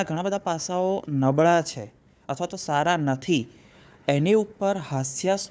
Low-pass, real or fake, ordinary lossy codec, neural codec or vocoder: none; fake; none; codec, 16 kHz, 4 kbps, FunCodec, trained on Chinese and English, 50 frames a second